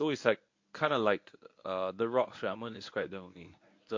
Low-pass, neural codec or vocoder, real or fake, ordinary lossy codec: 7.2 kHz; codec, 24 kHz, 0.9 kbps, WavTokenizer, medium speech release version 1; fake; MP3, 48 kbps